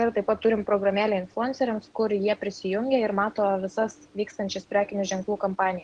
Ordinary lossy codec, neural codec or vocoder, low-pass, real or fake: Opus, 16 kbps; none; 9.9 kHz; real